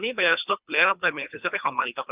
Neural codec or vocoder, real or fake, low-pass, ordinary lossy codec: codec, 24 kHz, 3 kbps, HILCodec; fake; 3.6 kHz; Opus, 24 kbps